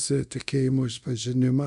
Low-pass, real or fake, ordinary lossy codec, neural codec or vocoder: 10.8 kHz; fake; MP3, 96 kbps; codec, 24 kHz, 0.9 kbps, DualCodec